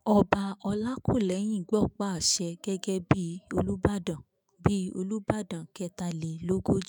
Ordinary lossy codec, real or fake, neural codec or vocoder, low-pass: none; fake; autoencoder, 48 kHz, 128 numbers a frame, DAC-VAE, trained on Japanese speech; none